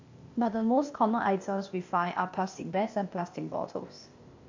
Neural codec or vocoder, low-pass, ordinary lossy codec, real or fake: codec, 16 kHz, 0.8 kbps, ZipCodec; 7.2 kHz; AAC, 48 kbps; fake